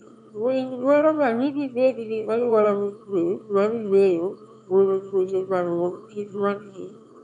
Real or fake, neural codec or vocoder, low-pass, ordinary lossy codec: fake; autoencoder, 22.05 kHz, a latent of 192 numbers a frame, VITS, trained on one speaker; 9.9 kHz; none